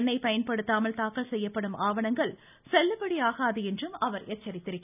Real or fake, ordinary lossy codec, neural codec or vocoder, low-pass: real; none; none; 3.6 kHz